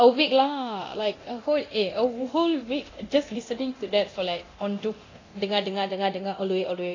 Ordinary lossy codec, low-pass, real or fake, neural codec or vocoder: AAC, 32 kbps; 7.2 kHz; fake; codec, 24 kHz, 0.9 kbps, DualCodec